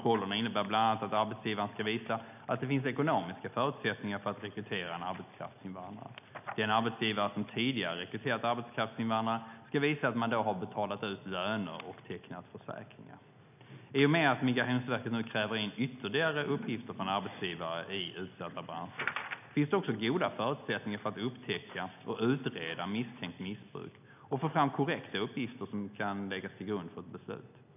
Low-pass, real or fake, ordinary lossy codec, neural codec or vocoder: 3.6 kHz; real; none; none